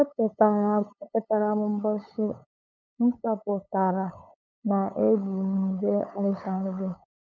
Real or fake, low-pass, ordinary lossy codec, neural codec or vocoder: fake; none; none; codec, 16 kHz, 8 kbps, FunCodec, trained on LibriTTS, 25 frames a second